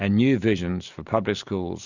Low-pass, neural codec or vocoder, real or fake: 7.2 kHz; none; real